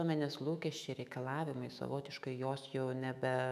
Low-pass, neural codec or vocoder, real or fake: 14.4 kHz; autoencoder, 48 kHz, 128 numbers a frame, DAC-VAE, trained on Japanese speech; fake